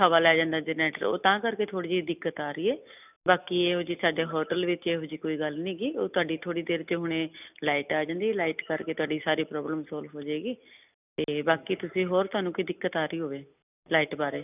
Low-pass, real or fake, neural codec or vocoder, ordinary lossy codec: 3.6 kHz; real; none; none